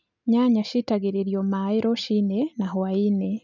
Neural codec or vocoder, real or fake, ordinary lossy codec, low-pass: none; real; none; 7.2 kHz